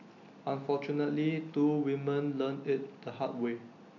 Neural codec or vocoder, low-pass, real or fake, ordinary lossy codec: none; 7.2 kHz; real; none